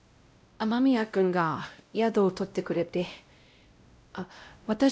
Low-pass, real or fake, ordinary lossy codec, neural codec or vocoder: none; fake; none; codec, 16 kHz, 0.5 kbps, X-Codec, WavLM features, trained on Multilingual LibriSpeech